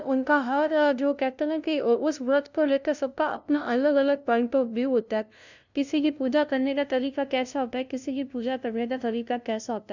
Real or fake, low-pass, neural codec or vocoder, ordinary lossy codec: fake; 7.2 kHz; codec, 16 kHz, 0.5 kbps, FunCodec, trained on LibriTTS, 25 frames a second; none